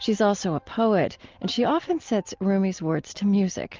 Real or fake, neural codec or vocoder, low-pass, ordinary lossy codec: real; none; 7.2 kHz; Opus, 32 kbps